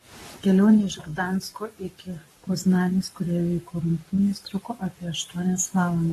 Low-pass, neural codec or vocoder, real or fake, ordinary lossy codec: 19.8 kHz; codec, 44.1 kHz, 7.8 kbps, Pupu-Codec; fake; AAC, 32 kbps